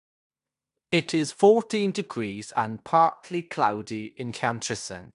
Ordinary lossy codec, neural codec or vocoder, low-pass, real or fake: none; codec, 16 kHz in and 24 kHz out, 0.9 kbps, LongCat-Audio-Codec, fine tuned four codebook decoder; 10.8 kHz; fake